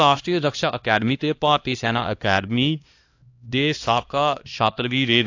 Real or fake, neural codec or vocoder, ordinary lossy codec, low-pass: fake; codec, 16 kHz, 1 kbps, X-Codec, HuBERT features, trained on LibriSpeech; AAC, 48 kbps; 7.2 kHz